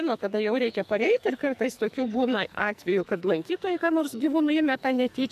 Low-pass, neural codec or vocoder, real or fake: 14.4 kHz; codec, 44.1 kHz, 2.6 kbps, SNAC; fake